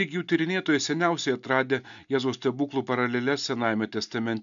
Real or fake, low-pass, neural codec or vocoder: real; 7.2 kHz; none